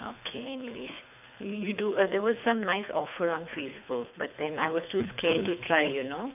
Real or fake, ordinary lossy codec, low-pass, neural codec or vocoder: fake; none; 3.6 kHz; codec, 24 kHz, 3 kbps, HILCodec